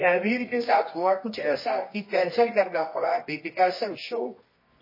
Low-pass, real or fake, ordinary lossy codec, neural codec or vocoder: 5.4 kHz; fake; MP3, 24 kbps; codec, 24 kHz, 0.9 kbps, WavTokenizer, medium music audio release